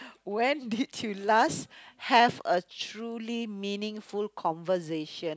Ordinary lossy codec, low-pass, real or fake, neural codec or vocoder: none; none; real; none